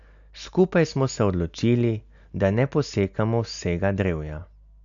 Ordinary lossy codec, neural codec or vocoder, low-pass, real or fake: none; none; 7.2 kHz; real